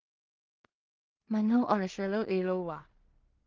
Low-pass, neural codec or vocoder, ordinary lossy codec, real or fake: 7.2 kHz; codec, 16 kHz in and 24 kHz out, 0.4 kbps, LongCat-Audio-Codec, two codebook decoder; Opus, 32 kbps; fake